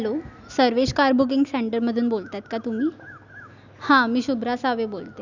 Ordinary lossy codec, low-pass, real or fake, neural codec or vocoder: none; 7.2 kHz; real; none